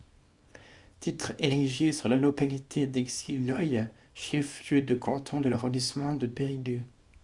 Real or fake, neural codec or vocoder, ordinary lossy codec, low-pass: fake; codec, 24 kHz, 0.9 kbps, WavTokenizer, small release; Opus, 64 kbps; 10.8 kHz